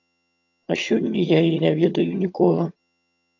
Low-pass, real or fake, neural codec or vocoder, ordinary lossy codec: 7.2 kHz; fake; vocoder, 22.05 kHz, 80 mel bands, HiFi-GAN; AAC, 48 kbps